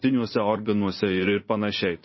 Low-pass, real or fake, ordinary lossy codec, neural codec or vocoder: 7.2 kHz; fake; MP3, 24 kbps; vocoder, 44.1 kHz, 80 mel bands, Vocos